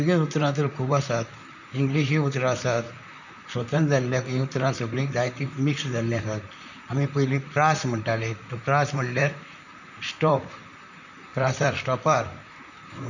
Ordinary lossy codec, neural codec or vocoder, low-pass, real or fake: none; vocoder, 44.1 kHz, 128 mel bands, Pupu-Vocoder; 7.2 kHz; fake